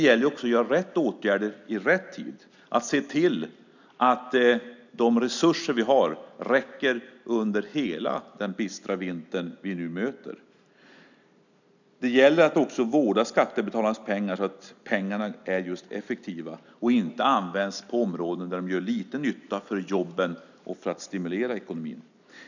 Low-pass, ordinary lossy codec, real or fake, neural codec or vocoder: 7.2 kHz; none; real; none